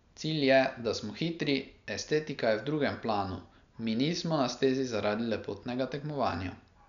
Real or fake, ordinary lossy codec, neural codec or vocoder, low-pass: real; none; none; 7.2 kHz